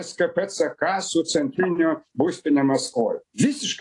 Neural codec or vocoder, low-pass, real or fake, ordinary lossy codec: none; 10.8 kHz; real; AAC, 48 kbps